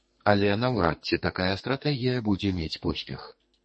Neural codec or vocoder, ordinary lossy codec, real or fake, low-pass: codec, 44.1 kHz, 2.6 kbps, SNAC; MP3, 32 kbps; fake; 10.8 kHz